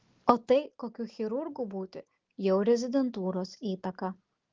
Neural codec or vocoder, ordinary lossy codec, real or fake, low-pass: none; Opus, 16 kbps; real; 7.2 kHz